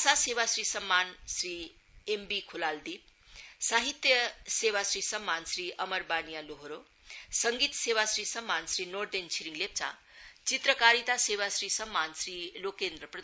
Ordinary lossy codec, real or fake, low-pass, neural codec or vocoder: none; real; none; none